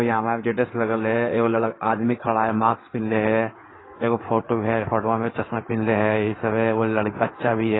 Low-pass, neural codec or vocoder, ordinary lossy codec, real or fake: 7.2 kHz; codec, 16 kHz in and 24 kHz out, 2.2 kbps, FireRedTTS-2 codec; AAC, 16 kbps; fake